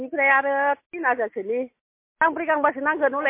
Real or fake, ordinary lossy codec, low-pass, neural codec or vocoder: real; MP3, 24 kbps; 3.6 kHz; none